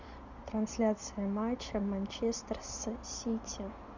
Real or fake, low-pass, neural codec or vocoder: real; 7.2 kHz; none